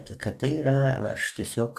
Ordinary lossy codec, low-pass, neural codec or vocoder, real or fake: AAC, 96 kbps; 14.4 kHz; codec, 44.1 kHz, 2.6 kbps, DAC; fake